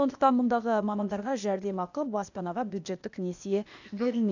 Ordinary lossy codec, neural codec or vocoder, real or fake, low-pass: none; codec, 16 kHz, 0.8 kbps, ZipCodec; fake; 7.2 kHz